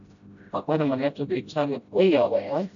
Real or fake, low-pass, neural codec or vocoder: fake; 7.2 kHz; codec, 16 kHz, 0.5 kbps, FreqCodec, smaller model